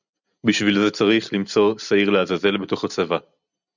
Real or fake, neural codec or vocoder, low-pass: real; none; 7.2 kHz